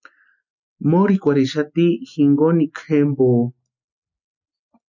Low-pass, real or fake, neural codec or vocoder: 7.2 kHz; real; none